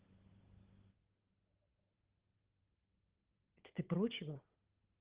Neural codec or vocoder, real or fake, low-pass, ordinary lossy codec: codec, 16 kHz, 8 kbps, FunCodec, trained on Chinese and English, 25 frames a second; fake; 3.6 kHz; Opus, 16 kbps